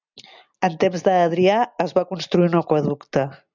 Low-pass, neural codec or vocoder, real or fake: 7.2 kHz; none; real